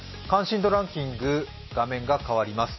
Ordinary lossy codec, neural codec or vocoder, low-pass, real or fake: MP3, 24 kbps; none; 7.2 kHz; real